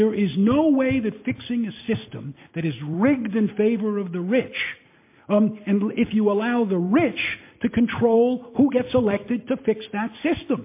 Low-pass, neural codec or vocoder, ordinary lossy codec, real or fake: 3.6 kHz; none; MP3, 24 kbps; real